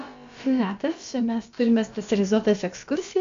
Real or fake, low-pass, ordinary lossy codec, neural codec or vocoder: fake; 7.2 kHz; MP3, 64 kbps; codec, 16 kHz, about 1 kbps, DyCAST, with the encoder's durations